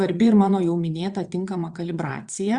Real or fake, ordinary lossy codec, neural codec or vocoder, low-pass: fake; Opus, 64 kbps; vocoder, 22.05 kHz, 80 mel bands, WaveNeXt; 9.9 kHz